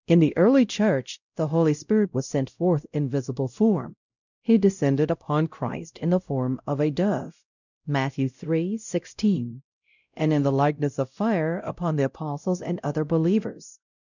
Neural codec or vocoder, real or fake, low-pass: codec, 16 kHz, 0.5 kbps, X-Codec, WavLM features, trained on Multilingual LibriSpeech; fake; 7.2 kHz